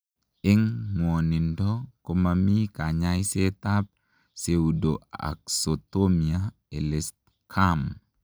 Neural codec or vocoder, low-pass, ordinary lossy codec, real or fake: none; none; none; real